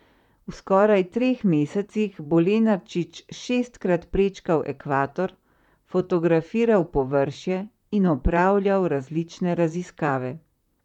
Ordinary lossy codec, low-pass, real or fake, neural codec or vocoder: none; 19.8 kHz; fake; vocoder, 44.1 kHz, 128 mel bands, Pupu-Vocoder